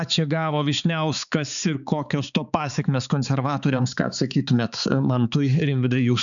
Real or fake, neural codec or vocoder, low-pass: fake; codec, 16 kHz, 4 kbps, X-Codec, HuBERT features, trained on balanced general audio; 7.2 kHz